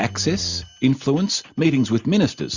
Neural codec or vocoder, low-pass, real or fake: none; 7.2 kHz; real